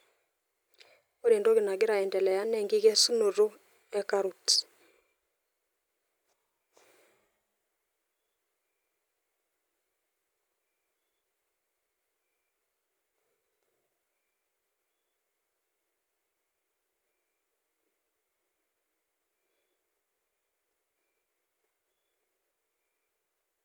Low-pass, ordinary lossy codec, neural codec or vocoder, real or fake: none; none; none; real